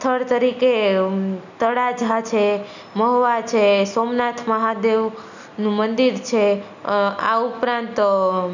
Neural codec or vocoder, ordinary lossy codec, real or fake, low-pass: none; none; real; 7.2 kHz